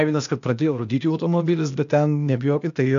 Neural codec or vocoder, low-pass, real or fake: codec, 16 kHz, 0.8 kbps, ZipCodec; 7.2 kHz; fake